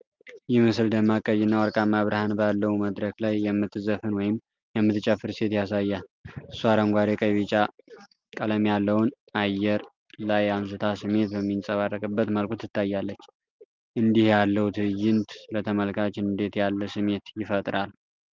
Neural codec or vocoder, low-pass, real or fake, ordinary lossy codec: none; 7.2 kHz; real; Opus, 32 kbps